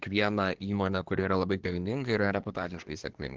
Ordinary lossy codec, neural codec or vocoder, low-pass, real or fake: Opus, 16 kbps; codec, 24 kHz, 1 kbps, SNAC; 7.2 kHz; fake